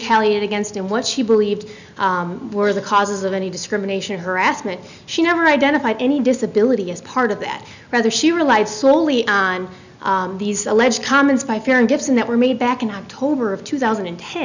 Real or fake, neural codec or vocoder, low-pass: real; none; 7.2 kHz